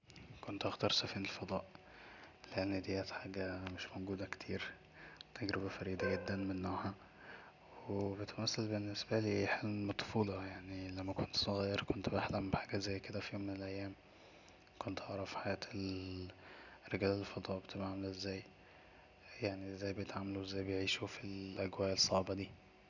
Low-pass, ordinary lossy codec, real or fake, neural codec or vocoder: 7.2 kHz; none; real; none